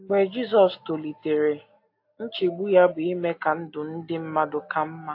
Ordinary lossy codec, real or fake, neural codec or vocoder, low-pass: AAC, 32 kbps; real; none; 5.4 kHz